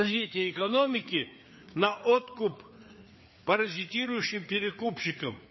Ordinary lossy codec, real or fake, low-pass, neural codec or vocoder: MP3, 24 kbps; fake; 7.2 kHz; codec, 16 kHz, 4 kbps, FreqCodec, larger model